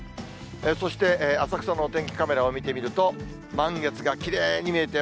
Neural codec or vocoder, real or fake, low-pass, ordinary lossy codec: none; real; none; none